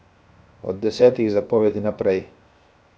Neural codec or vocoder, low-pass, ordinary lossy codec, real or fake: codec, 16 kHz, 0.7 kbps, FocalCodec; none; none; fake